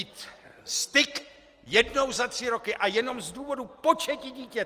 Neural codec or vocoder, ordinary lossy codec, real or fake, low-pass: none; Opus, 24 kbps; real; 14.4 kHz